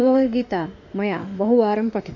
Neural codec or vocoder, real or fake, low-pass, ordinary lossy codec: autoencoder, 48 kHz, 32 numbers a frame, DAC-VAE, trained on Japanese speech; fake; 7.2 kHz; none